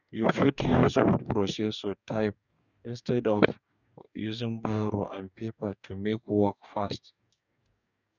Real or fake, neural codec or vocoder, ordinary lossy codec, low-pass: fake; codec, 44.1 kHz, 2.6 kbps, DAC; none; 7.2 kHz